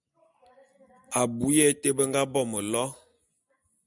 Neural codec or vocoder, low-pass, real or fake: none; 10.8 kHz; real